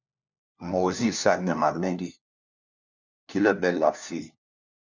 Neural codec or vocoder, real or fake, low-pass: codec, 16 kHz, 1 kbps, FunCodec, trained on LibriTTS, 50 frames a second; fake; 7.2 kHz